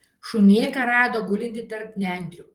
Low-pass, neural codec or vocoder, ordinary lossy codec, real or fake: 19.8 kHz; vocoder, 44.1 kHz, 128 mel bands, Pupu-Vocoder; Opus, 24 kbps; fake